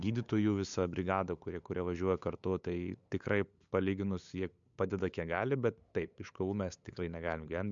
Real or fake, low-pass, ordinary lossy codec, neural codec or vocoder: fake; 7.2 kHz; MP3, 64 kbps; codec, 16 kHz, 8 kbps, FunCodec, trained on LibriTTS, 25 frames a second